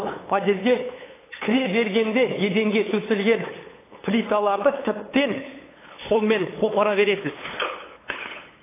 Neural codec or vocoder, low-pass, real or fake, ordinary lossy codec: codec, 16 kHz, 8 kbps, FunCodec, trained on LibriTTS, 25 frames a second; 3.6 kHz; fake; AAC, 16 kbps